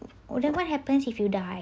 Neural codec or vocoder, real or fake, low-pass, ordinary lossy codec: none; real; none; none